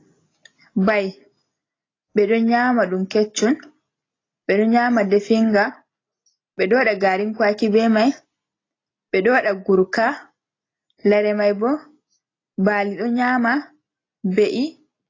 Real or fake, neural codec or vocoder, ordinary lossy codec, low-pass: real; none; AAC, 32 kbps; 7.2 kHz